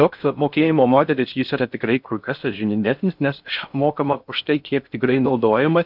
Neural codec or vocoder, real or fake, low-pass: codec, 16 kHz in and 24 kHz out, 0.6 kbps, FocalCodec, streaming, 4096 codes; fake; 5.4 kHz